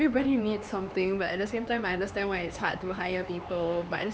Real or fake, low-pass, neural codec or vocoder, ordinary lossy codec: fake; none; codec, 16 kHz, 4 kbps, X-Codec, HuBERT features, trained on LibriSpeech; none